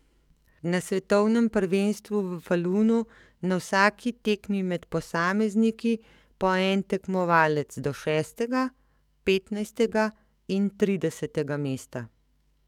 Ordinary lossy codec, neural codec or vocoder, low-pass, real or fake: none; codec, 44.1 kHz, 7.8 kbps, DAC; 19.8 kHz; fake